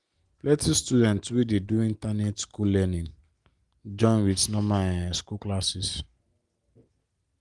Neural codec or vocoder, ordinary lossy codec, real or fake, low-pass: none; Opus, 24 kbps; real; 10.8 kHz